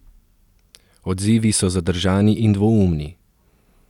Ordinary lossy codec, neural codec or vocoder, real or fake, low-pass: none; none; real; 19.8 kHz